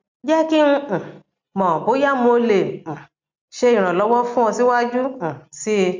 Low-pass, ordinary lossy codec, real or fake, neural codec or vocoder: 7.2 kHz; MP3, 64 kbps; real; none